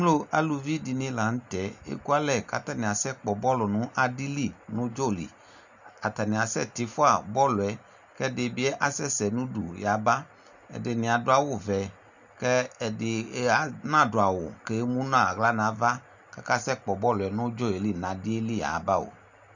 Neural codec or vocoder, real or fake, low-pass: none; real; 7.2 kHz